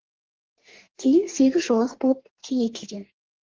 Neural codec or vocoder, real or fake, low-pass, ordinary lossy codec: codec, 44.1 kHz, 2.6 kbps, DAC; fake; 7.2 kHz; Opus, 16 kbps